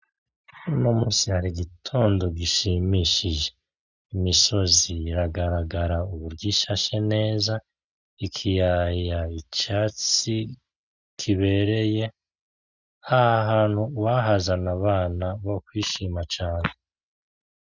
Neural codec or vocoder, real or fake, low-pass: none; real; 7.2 kHz